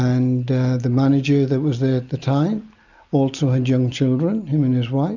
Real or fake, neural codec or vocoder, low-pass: real; none; 7.2 kHz